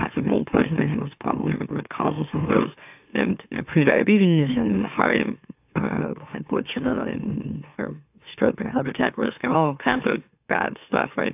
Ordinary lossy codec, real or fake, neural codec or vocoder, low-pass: AAC, 32 kbps; fake; autoencoder, 44.1 kHz, a latent of 192 numbers a frame, MeloTTS; 3.6 kHz